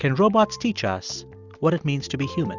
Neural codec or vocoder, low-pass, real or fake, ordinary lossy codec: none; 7.2 kHz; real; Opus, 64 kbps